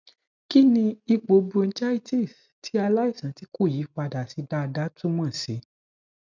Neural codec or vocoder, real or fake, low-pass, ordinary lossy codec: none; real; 7.2 kHz; none